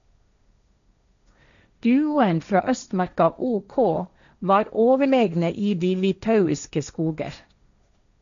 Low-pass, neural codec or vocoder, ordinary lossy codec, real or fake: 7.2 kHz; codec, 16 kHz, 1.1 kbps, Voila-Tokenizer; none; fake